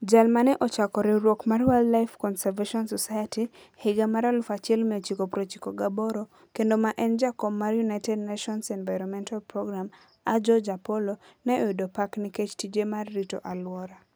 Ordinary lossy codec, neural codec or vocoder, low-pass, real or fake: none; none; none; real